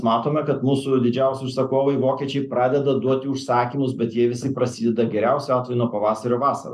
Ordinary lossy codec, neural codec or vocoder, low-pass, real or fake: MP3, 96 kbps; none; 14.4 kHz; real